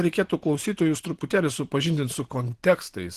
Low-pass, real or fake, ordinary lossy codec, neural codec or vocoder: 14.4 kHz; fake; Opus, 16 kbps; vocoder, 44.1 kHz, 128 mel bands every 512 samples, BigVGAN v2